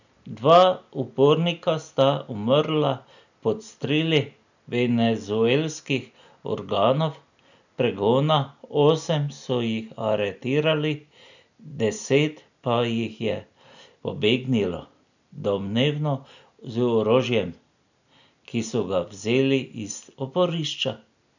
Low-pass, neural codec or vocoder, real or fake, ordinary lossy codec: 7.2 kHz; none; real; none